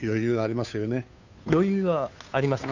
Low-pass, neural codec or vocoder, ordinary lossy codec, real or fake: 7.2 kHz; codec, 16 kHz, 2 kbps, FunCodec, trained on Chinese and English, 25 frames a second; none; fake